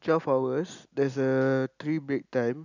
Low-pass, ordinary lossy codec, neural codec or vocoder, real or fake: 7.2 kHz; none; none; real